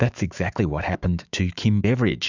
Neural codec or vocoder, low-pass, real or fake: autoencoder, 48 kHz, 128 numbers a frame, DAC-VAE, trained on Japanese speech; 7.2 kHz; fake